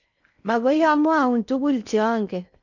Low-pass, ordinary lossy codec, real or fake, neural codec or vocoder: 7.2 kHz; none; fake; codec, 16 kHz in and 24 kHz out, 0.6 kbps, FocalCodec, streaming, 4096 codes